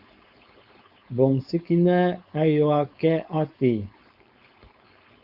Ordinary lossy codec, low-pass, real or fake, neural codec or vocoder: Opus, 64 kbps; 5.4 kHz; fake; codec, 16 kHz, 4.8 kbps, FACodec